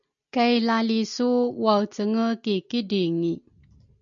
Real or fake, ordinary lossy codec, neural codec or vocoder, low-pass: real; MP3, 96 kbps; none; 7.2 kHz